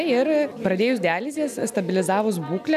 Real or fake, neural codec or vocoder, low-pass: real; none; 14.4 kHz